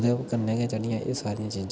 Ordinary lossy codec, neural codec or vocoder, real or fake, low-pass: none; none; real; none